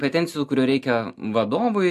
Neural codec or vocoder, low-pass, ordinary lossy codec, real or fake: none; 14.4 kHz; AAC, 96 kbps; real